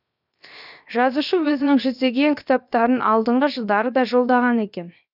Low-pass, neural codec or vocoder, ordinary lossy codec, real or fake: 5.4 kHz; codec, 16 kHz, 0.7 kbps, FocalCodec; none; fake